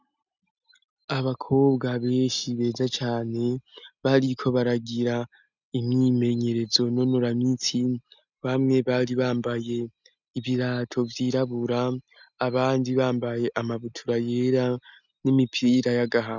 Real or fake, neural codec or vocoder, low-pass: real; none; 7.2 kHz